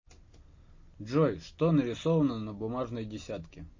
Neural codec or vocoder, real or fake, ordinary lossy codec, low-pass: none; real; MP3, 32 kbps; 7.2 kHz